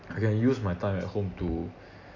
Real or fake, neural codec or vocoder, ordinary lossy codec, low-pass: real; none; none; 7.2 kHz